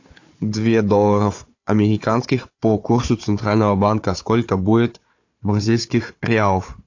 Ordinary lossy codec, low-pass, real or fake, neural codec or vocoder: AAC, 48 kbps; 7.2 kHz; fake; codec, 16 kHz, 4 kbps, FunCodec, trained on Chinese and English, 50 frames a second